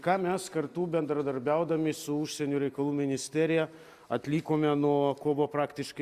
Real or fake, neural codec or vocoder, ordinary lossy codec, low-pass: real; none; Opus, 64 kbps; 14.4 kHz